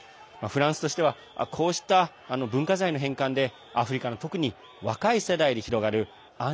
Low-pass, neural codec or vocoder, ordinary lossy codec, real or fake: none; none; none; real